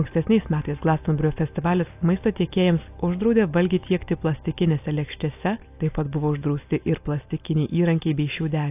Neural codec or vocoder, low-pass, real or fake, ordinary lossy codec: none; 3.6 kHz; real; AAC, 32 kbps